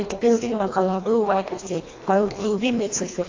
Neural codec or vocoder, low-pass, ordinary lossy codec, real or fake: codec, 24 kHz, 1.5 kbps, HILCodec; 7.2 kHz; AAC, 32 kbps; fake